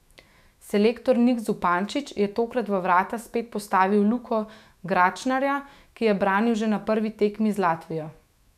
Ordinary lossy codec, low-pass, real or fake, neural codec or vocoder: none; 14.4 kHz; fake; autoencoder, 48 kHz, 128 numbers a frame, DAC-VAE, trained on Japanese speech